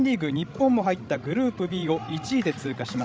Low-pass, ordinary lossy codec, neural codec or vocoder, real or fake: none; none; codec, 16 kHz, 16 kbps, FreqCodec, larger model; fake